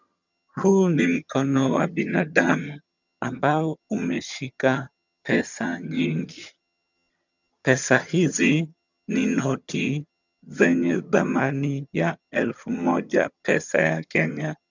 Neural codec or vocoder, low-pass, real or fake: vocoder, 22.05 kHz, 80 mel bands, HiFi-GAN; 7.2 kHz; fake